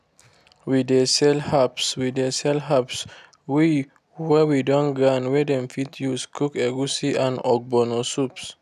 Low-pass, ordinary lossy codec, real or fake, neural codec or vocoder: 14.4 kHz; none; real; none